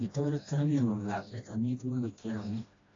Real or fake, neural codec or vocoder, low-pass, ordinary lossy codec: fake; codec, 16 kHz, 1 kbps, FreqCodec, smaller model; 7.2 kHz; AAC, 32 kbps